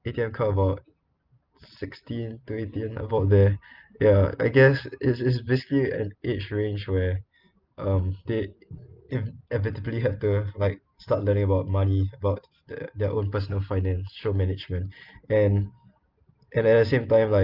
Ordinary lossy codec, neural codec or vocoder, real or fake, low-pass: Opus, 32 kbps; none; real; 5.4 kHz